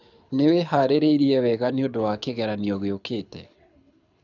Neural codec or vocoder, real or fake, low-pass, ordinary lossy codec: codec, 24 kHz, 6 kbps, HILCodec; fake; 7.2 kHz; none